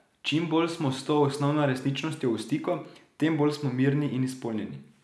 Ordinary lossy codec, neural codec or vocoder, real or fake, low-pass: none; none; real; none